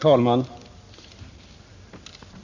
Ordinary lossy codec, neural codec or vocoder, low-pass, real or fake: none; none; 7.2 kHz; real